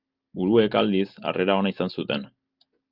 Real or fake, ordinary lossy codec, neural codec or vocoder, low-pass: real; Opus, 24 kbps; none; 5.4 kHz